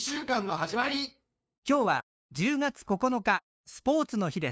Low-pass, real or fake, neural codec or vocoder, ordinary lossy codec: none; fake; codec, 16 kHz, 2 kbps, FunCodec, trained on LibriTTS, 25 frames a second; none